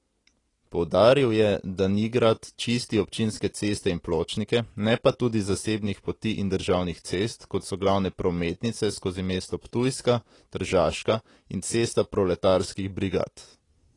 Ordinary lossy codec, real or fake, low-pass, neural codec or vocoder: AAC, 32 kbps; real; 10.8 kHz; none